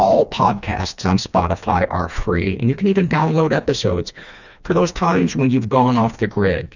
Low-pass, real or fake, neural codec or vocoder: 7.2 kHz; fake; codec, 16 kHz, 2 kbps, FreqCodec, smaller model